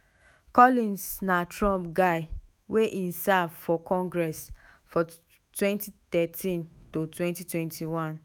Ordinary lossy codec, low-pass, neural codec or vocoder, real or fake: none; none; autoencoder, 48 kHz, 128 numbers a frame, DAC-VAE, trained on Japanese speech; fake